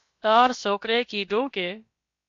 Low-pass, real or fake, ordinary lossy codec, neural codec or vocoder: 7.2 kHz; fake; MP3, 48 kbps; codec, 16 kHz, about 1 kbps, DyCAST, with the encoder's durations